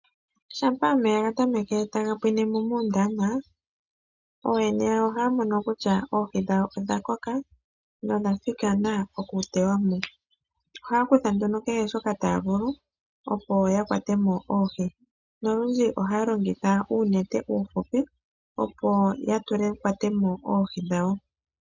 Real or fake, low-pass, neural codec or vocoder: real; 7.2 kHz; none